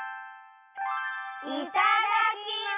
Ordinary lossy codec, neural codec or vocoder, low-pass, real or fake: none; none; 3.6 kHz; real